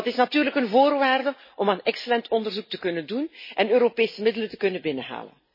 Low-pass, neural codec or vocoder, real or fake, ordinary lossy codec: 5.4 kHz; none; real; MP3, 24 kbps